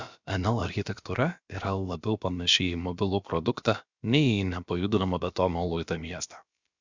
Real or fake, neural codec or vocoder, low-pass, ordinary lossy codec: fake; codec, 16 kHz, about 1 kbps, DyCAST, with the encoder's durations; 7.2 kHz; Opus, 64 kbps